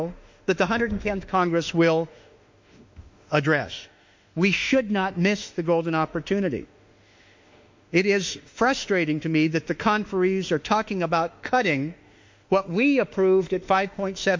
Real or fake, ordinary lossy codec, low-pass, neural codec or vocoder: fake; MP3, 48 kbps; 7.2 kHz; autoencoder, 48 kHz, 32 numbers a frame, DAC-VAE, trained on Japanese speech